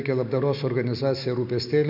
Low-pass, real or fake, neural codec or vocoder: 5.4 kHz; real; none